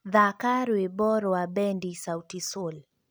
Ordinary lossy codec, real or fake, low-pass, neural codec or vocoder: none; real; none; none